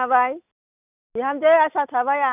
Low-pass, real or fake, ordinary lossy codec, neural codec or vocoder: 3.6 kHz; real; none; none